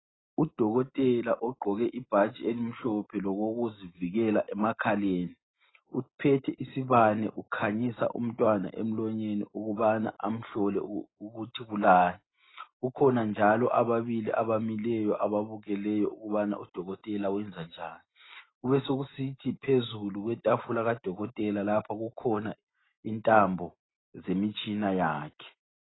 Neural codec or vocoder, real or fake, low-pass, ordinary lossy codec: none; real; 7.2 kHz; AAC, 16 kbps